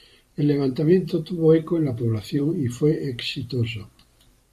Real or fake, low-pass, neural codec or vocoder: fake; 14.4 kHz; vocoder, 44.1 kHz, 128 mel bands every 512 samples, BigVGAN v2